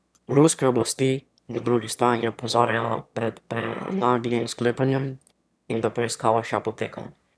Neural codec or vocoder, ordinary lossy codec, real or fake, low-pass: autoencoder, 22.05 kHz, a latent of 192 numbers a frame, VITS, trained on one speaker; none; fake; none